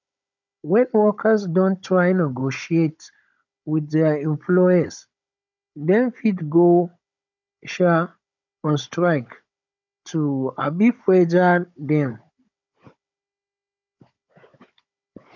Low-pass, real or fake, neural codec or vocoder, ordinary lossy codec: 7.2 kHz; fake; codec, 16 kHz, 16 kbps, FunCodec, trained on Chinese and English, 50 frames a second; none